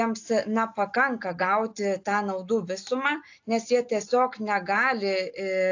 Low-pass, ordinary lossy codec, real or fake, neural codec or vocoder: 7.2 kHz; AAC, 48 kbps; real; none